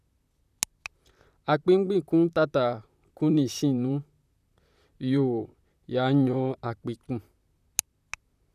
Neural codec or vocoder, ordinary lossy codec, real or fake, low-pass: vocoder, 44.1 kHz, 128 mel bands, Pupu-Vocoder; none; fake; 14.4 kHz